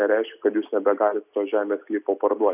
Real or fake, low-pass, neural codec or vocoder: real; 3.6 kHz; none